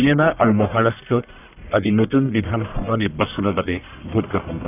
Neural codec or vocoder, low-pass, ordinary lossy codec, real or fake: codec, 44.1 kHz, 1.7 kbps, Pupu-Codec; 3.6 kHz; none; fake